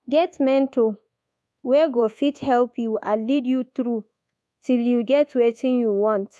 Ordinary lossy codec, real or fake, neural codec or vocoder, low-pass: none; fake; codec, 24 kHz, 1.2 kbps, DualCodec; none